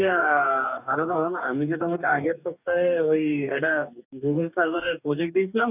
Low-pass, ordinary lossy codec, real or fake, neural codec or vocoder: 3.6 kHz; none; fake; codec, 44.1 kHz, 2.6 kbps, DAC